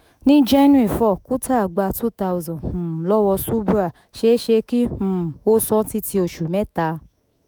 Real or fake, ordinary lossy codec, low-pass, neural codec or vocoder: fake; none; none; autoencoder, 48 kHz, 128 numbers a frame, DAC-VAE, trained on Japanese speech